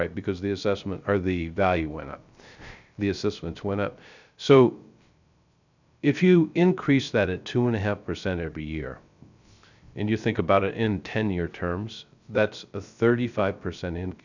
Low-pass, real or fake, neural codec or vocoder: 7.2 kHz; fake; codec, 16 kHz, 0.3 kbps, FocalCodec